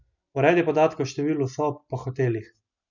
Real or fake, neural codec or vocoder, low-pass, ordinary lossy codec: real; none; 7.2 kHz; none